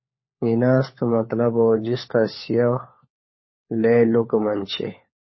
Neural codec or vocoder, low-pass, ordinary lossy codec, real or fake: codec, 16 kHz, 4 kbps, FunCodec, trained on LibriTTS, 50 frames a second; 7.2 kHz; MP3, 24 kbps; fake